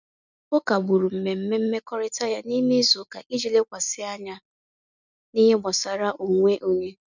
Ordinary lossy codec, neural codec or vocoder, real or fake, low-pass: none; none; real; 7.2 kHz